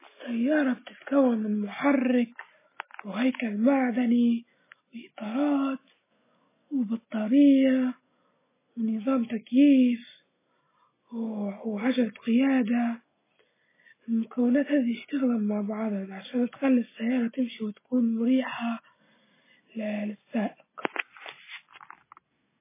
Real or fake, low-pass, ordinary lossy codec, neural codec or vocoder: real; 3.6 kHz; MP3, 16 kbps; none